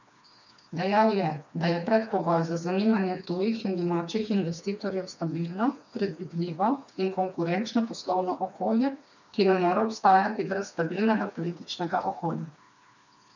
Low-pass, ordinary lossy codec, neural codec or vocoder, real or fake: 7.2 kHz; none; codec, 16 kHz, 2 kbps, FreqCodec, smaller model; fake